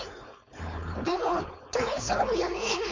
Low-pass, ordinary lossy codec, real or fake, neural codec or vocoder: 7.2 kHz; MP3, 48 kbps; fake; codec, 16 kHz, 4.8 kbps, FACodec